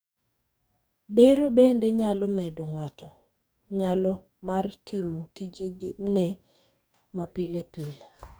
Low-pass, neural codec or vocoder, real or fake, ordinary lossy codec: none; codec, 44.1 kHz, 2.6 kbps, DAC; fake; none